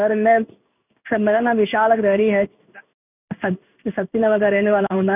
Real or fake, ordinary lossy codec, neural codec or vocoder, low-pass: fake; none; codec, 16 kHz in and 24 kHz out, 1 kbps, XY-Tokenizer; 3.6 kHz